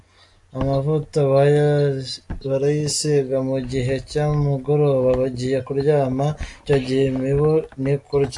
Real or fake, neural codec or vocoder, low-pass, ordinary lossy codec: real; none; 10.8 kHz; AAC, 64 kbps